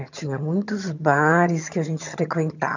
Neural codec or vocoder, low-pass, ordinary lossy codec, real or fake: vocoder, 22.05 kHz, 80 mel bands, HiFi-GAN; 7.2 kHz; none; fake